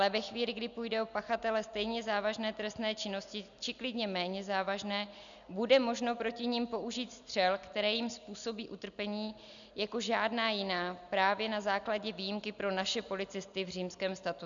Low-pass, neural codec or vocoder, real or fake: 7.2 kHz; none; real